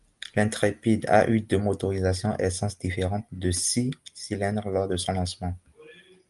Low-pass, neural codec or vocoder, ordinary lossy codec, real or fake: 10.8 kHz; none; Opus, 32 kbps; real